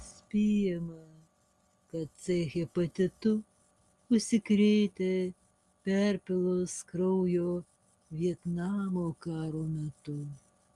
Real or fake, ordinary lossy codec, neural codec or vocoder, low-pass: real; Opus, 32 kbps; none; 10.8 kHz